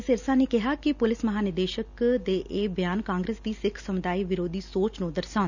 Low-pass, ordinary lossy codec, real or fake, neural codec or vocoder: 7.2 kHz; none; real; none